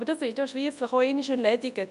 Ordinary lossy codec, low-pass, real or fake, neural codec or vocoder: none; 10.8 kHz; fake; codec, 24 kHz, 0.9 kbps, WavTokenizer, large speech release